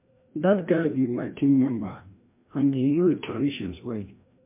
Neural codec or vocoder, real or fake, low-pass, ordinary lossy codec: codec, 16 kHz, 1 kbps, FreqCodec, larger model; fake; 3.6 kHz; MP3, 32 kbps